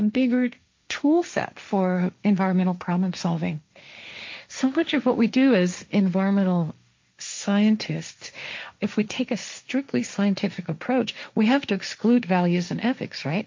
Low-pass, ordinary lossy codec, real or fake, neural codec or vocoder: 7.2 kHz; MP3, 48 kbps; fake; codec, 16 kHz, 1.1 kbps, Voila-Tokenizer